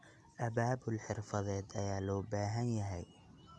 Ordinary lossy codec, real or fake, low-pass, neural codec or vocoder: none; real; none; none